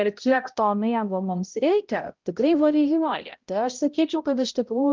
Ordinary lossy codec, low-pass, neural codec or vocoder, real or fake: Opus, 32 kbps; 7.2 kHz; codec, 16 kHz, 0.5 kbps, X-Codec, HuBERT features, trained on balanced general audio; fake